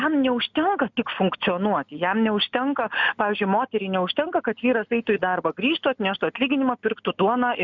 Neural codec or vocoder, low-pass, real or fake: none; 7.2 kHz; real